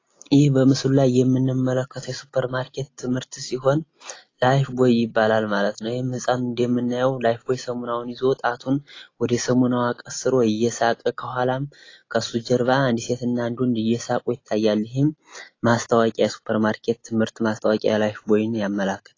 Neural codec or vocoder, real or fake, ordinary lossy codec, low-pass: none; real; AAC, 32 kbps; 7.2 kHz